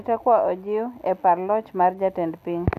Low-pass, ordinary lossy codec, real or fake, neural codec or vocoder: 14.4 kHz; none; real; none